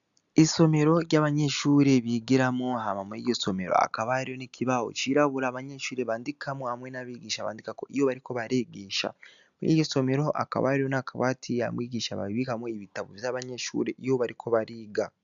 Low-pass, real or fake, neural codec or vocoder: 7.2 kHz; real; none